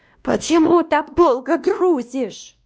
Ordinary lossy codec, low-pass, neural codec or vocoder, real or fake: none; none; codec, 16 kHz, 1 kbps, X-Codec, WavLM features, trained on Multilingual LibriSpeech; fake